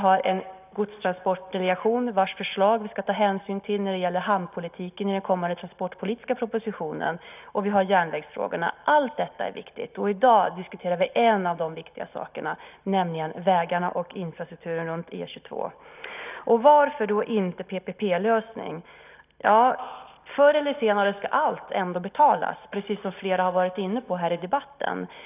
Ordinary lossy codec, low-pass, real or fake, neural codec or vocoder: none; 3.6 kHz; real; none